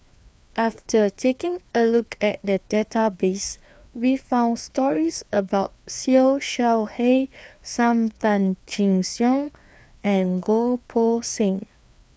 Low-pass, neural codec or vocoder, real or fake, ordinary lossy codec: none; codec, 16 kHz, 2 kbps, FreqCodec, larger model; fake; none